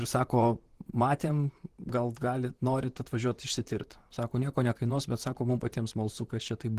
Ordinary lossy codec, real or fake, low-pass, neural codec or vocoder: Opus, 16 kbps; fake; 14.4 kHz; vocoder, 44.1 kHz, 128 mel bands, Pupu-Vocoder